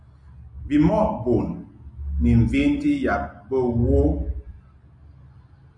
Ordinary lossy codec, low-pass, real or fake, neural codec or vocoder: MP3, 64 kbps; 9.9 kHz; real; none